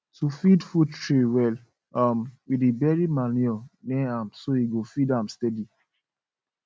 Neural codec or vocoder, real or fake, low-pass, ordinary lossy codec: none; real; none; none